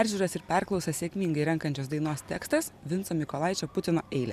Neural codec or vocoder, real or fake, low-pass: none; real; 14.4 kHz